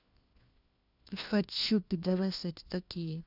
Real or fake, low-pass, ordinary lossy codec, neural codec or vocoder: fake; 5.4 kHz; none; codec, 16 kHz, 1 kbps, FunCodec, trained on LibriTTS, 50 frames a second